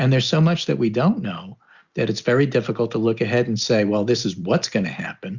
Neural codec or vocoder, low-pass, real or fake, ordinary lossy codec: none; 7.2 kHz; real; Opus, 64 kbps